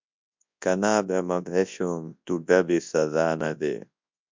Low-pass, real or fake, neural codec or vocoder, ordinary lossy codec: 7.2 kHz; fake; codec, 24 kHz, 0.9 kbps, WavTokenizer, large speech release; MP3, 64 kbps